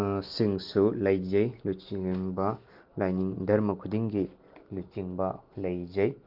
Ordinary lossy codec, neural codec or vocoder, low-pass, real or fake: Opus, 32 kbps; none; 5.4 kHz; real